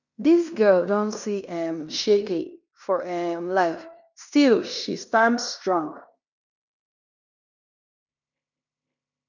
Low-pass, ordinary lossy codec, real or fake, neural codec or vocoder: 7.2 kHz; none; fake; codec, 16 kHz in and 24 kHz out, 0.9 kbps, LongCat-Audio-Codec, fine tuned four codebook decoder